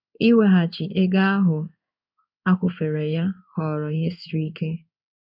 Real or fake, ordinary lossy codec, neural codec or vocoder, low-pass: fake; none; codec, 16 kHz in and 24 kHz out, 1 kbps, XY-Tokenizer; 5.4 kHz